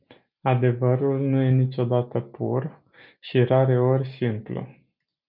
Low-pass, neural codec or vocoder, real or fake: 5.4 kHz; none; real